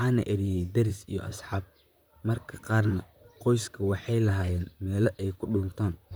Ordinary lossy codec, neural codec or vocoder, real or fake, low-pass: none; vocoder, 44.1 kHz, 128 mel bands, Pupu-Vocoder; fake; none